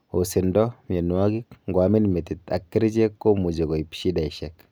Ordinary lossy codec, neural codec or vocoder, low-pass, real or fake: none; none; none; real